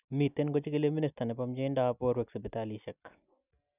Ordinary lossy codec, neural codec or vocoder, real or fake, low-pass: none; none; real; 3.6 kHz